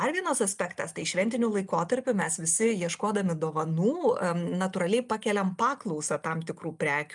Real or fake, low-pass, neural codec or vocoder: real; 10.8 kHz; none